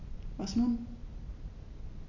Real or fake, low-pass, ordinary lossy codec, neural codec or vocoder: real; 7.2 kHz; none; none